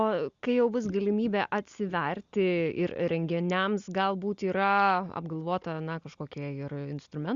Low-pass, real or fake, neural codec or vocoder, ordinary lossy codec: 7.2 kHz; real; none; Opus, 64 kbps